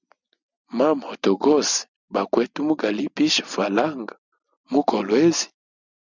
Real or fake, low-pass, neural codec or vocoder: real; 7.2 kHz; none